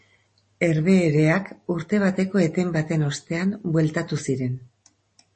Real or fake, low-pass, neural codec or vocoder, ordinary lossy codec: real; 10.8 kHz; none; MP3, 32 kbps